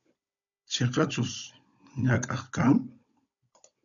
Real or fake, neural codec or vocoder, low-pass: fake; codec, 16 kHz, 16 kbps, FunCodec, trained on Chinese and English, 50 frames a second; 7.2 kHz